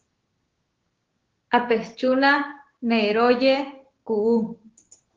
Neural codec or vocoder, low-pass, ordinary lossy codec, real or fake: codec, 16 kHz, 6 kbps, DAC; 7.2 kHz; Opus, 16 kbps; fake